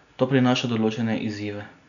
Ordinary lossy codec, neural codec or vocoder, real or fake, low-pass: none; none; real; 7.2 kHz